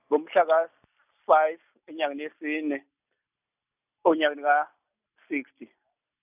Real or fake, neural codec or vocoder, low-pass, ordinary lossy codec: real; none; 3.6 kHz; none